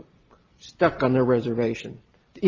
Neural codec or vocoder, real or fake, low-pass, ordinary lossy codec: none; real; 7.2 kHz; Opus, 32 kbps